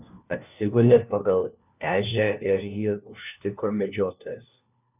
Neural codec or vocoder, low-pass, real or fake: codec, 16 kHz, 1 kbps, FunCodec, trained on LibriTTS, 50 frames a second; 3.6 kHz; fake